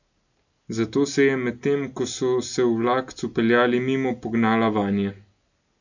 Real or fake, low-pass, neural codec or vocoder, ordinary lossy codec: real; 7.2 kHz; none; none